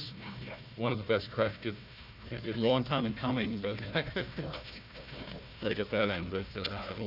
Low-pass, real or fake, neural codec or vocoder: 5.4 kHz; fake; codec, 16 kHz, 1 kbps, FunCodec, trained on Chinese and English, 50 frames a second